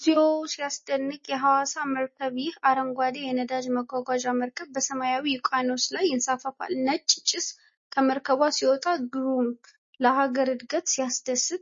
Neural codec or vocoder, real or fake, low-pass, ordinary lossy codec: none; real; 7.2 kHz; MP3, 32 kbps